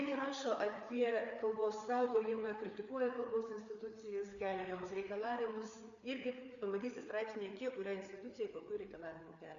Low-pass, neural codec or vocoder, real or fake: 7.2 kHz; codec, 16 kHz, 4 kbps, FreqCodec, larger model; fake